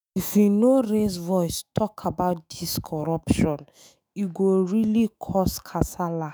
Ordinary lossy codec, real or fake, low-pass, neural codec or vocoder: none; fake; none; autoencoder, 48 kHz, 128 numbers a frame, DAC-VAE, trained on Japanese speech